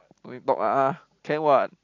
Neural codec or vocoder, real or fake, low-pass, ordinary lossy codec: none; real; 7.2 kHz; AAC, 48 kbps